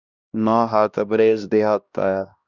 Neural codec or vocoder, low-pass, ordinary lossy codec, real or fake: codec, 16 kHz, 1 kbps, X-Codec, HuBERT features, trained on LibriSpeech; 7.2 kHz; Opus, 64 kbps; fake